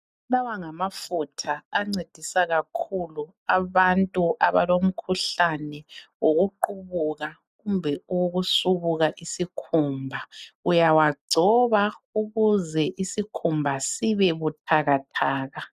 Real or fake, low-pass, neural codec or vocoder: real; 14.4 kHz; none